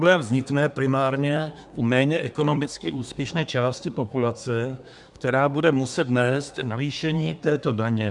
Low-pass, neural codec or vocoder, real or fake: 10.8 kHz; codec, 24 kHz, 1 kbps, SNAC; fake